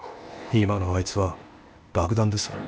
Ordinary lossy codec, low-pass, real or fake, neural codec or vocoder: none; none; fake; codec, 16 kHz, 0.8 kbps, ZipCodec